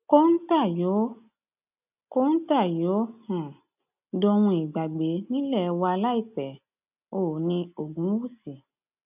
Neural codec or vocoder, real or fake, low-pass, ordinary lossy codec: none; real; 3.6 kHz; none